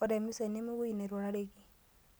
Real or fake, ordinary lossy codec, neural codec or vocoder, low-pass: real; none; none; none